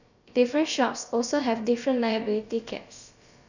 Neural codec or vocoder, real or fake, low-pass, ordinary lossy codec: codec, 16 kHz, about 1 kbps, DyCAST, with the encoder's durations; fake; 7.2 kHz; none